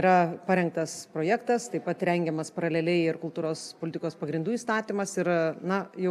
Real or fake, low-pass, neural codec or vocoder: real; 14.4 kHz; none